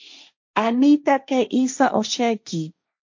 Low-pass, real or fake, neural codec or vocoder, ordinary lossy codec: 7.2 kHz; fake; codec, 16 kHz, 1.1 kbps, Voila-Tokenizer; MP3, 48 kbps